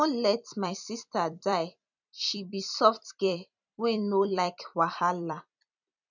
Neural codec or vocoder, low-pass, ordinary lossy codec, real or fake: vocoder, 44.1 kHz, 128 mel bands every 256 samples, BigVGAN v2; 7.2 kHz; none; fake